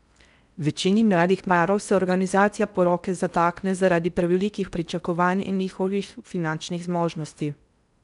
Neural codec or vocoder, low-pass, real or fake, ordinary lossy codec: codec, 16 kHz in and 24 kHz out, 0.6 kbps, FocalCodec, streaming, 4096 codes; 10.8 kHz; fake; none